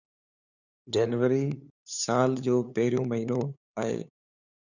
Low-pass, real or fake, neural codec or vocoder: 7.2 kHz; fake; codec, 16 kHz, 8 kbps, FunCodec, trained on LibriTTS, 25 frames a second